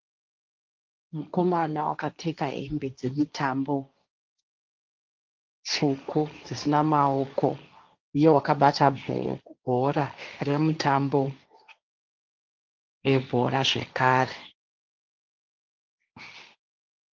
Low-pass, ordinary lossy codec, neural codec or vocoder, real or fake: 7.2 kHz; Opus, 24 kbps; codec, 16 kHz, 1.1 kbps, Voila-Tokenizer; fake